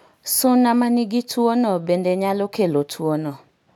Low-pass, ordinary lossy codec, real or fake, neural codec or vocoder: 19.8 kHz; none; real; none